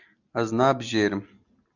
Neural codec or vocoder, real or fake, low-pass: none; real; 7.2 kHz